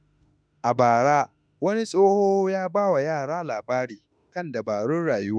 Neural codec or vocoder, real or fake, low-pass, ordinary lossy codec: autoencoder, 48 kHz, 32 numbers a frame, DAC-VAE, trained on Japanese speech; fake; 9.9 kHz; none